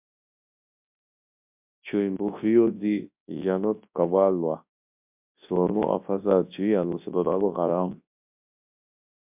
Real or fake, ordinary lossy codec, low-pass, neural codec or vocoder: fake; MP3, 32 kbps; 3.6 kHz; codec, 24 kHz, 0.9 kbps, WavTokenizer, large speech release